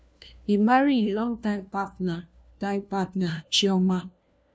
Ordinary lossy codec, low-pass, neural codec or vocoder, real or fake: none; none; codec, 16 kHz, 1 kbps, FunCodec, trained on LibriTTS, 50 frames a second; fake